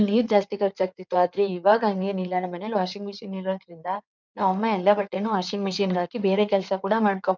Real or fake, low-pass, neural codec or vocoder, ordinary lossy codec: fake; 7.2 kHz; codec, 16 kHz in and 24 kHz out, 2.2 kbps, FireRedTTS-2 codec; none